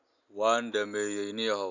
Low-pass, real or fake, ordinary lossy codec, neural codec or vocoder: 7.2 kHz; real; none; none